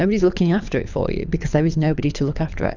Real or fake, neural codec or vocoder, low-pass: fake; codec, 24 kHz, 6 kbps, HILCodec; 7.2 kHz